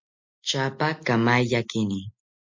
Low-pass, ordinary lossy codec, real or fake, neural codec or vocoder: 7.2 kHz; MP3, 64 kbps; real; none